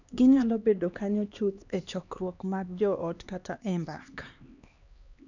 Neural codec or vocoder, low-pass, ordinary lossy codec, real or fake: codec, 16 kHz, 2 kbps, X-Codec, HuBERT features, trained on LibriSpeech; 7.2 kHz; none; fake